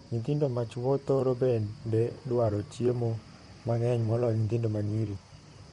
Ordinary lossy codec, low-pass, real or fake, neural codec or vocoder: MP3, 48 kbps; 19.8 kHz; fake; vocoder, 44.1 kHz, 128 mel bands, Pupu-Vocoder